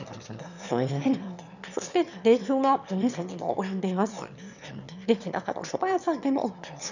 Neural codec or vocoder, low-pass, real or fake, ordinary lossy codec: autoencoder, 22.05 kHz, a latent of 192 numbers a frame, VITS, trained on one speaker; 7.2 kHz; fake; none